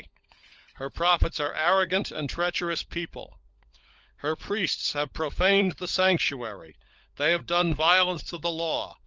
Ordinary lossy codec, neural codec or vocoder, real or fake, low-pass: Opus, 24 kbps; codec, 16 kHz, 16 kbps, FunCodec, trained on LibriTTS, 50 frames a second; fake; 7.2 kHz